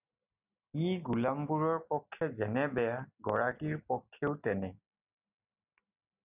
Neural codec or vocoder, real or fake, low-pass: none; real; 3.6 kHz